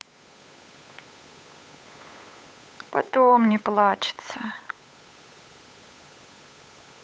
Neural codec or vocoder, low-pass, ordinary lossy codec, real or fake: codec, 16 kHz, 8 kbps, FunCodec, trained on Chinese and English, 25 frames a second; none; none; fake